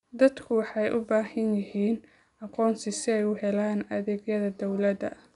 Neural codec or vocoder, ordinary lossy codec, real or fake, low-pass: none; none; real; 10.8 kHz